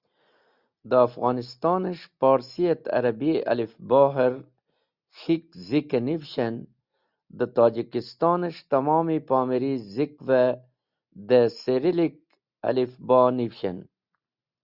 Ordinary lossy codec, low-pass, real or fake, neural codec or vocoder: Opus, 64 kbps; 5.4 kHz; real; none